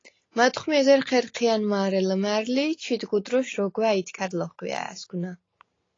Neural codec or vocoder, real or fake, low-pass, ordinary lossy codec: none; real; 7.2 kHz; AAC, 32 kbps